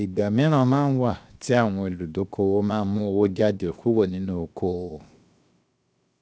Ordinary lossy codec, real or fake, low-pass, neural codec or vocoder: none; fake; none; codec, 16 kHz, 0.7 kbps, FocalCodec